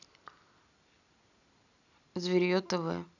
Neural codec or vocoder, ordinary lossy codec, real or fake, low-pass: none; none; real; 7.2 kHz